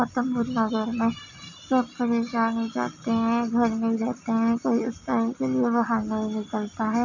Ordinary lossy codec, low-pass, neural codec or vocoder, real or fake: none; 7.2 kHz; none; real